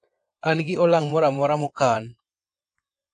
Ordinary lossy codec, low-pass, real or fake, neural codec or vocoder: AAC, 48 kbps; 9.9 kHz; fake; vocoder, 22.05 kHz, 80 mel bands, WaveNeXt